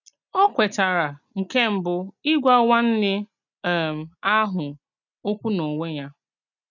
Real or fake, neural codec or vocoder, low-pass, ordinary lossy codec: real; none; 7.2 kHz; none